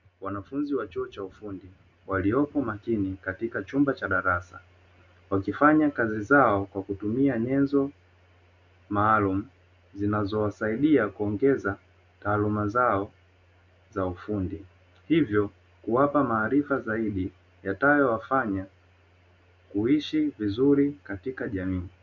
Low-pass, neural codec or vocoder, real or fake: 7.2 kHz; none; real